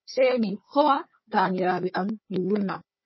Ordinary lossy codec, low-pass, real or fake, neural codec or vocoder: MP3, 24 kbps; 7.2 kHz; fake; codec, 16 kHz, 4 kbps, FunCodec, trained on Chinese and English, 50 frames a second